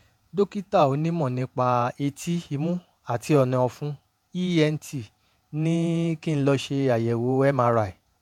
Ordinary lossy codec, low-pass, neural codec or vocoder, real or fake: MP3, 96 kbps; 19.8 kHz; vocoder, 48 kHz, 128 mel bands, Vocos; fake